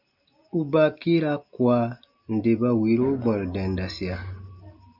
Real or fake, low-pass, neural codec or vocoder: real; 5.4 kHz; none